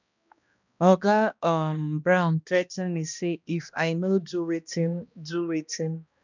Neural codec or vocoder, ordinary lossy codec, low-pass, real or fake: codec, 16 kHz, 1 kbps, X-Codec, HuBERT features, trained on balanced general audio; none; 7.2 kHz; fake